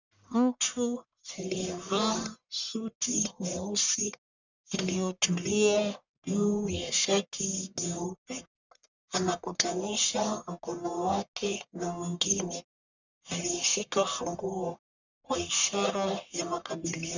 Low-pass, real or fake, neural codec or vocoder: 7.2 kHz; fake; codec, 44.1 kHz, 1.7 kbps, Pupu-Codec